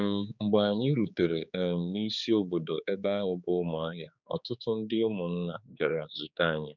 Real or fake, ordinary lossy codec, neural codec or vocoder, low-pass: fake; none; codec, 16 kHz, 4 kbps, X-Codec, HuBERT features, trained on general audio; 7.2 kHz